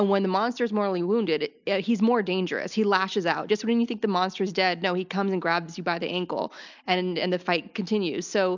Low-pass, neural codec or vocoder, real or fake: 7.2 kHz; none; real